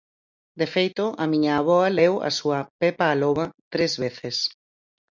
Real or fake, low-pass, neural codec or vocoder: real; 7.2 kHz; none